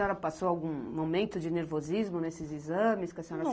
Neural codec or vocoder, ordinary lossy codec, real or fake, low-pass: none; none; real; none